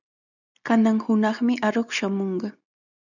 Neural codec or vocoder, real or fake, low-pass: none; real; 7.2 kHz